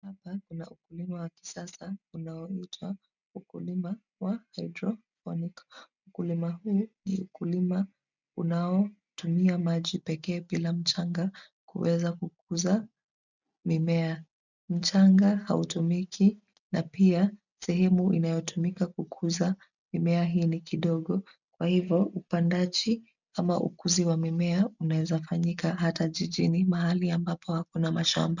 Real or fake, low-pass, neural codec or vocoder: real; 7.2 kHz; none